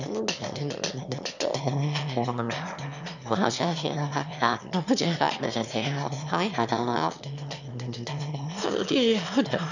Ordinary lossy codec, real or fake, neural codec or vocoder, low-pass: none; fake; autoencoder, 22.05 kHz, a latent of 192 numbers a frame, VITS, trained on one speaker; 7.2 kHz